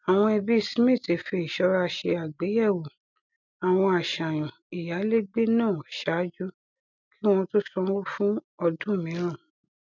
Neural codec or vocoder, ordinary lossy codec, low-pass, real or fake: none; none; 7.2 kHz; real